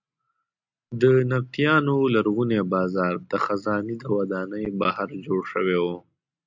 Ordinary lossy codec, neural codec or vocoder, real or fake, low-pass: MP3, 64 kbps; none; real; 7.2 kHz